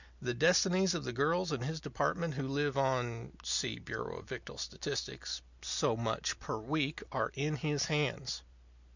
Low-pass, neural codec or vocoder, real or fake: 7.2 kHz; none; real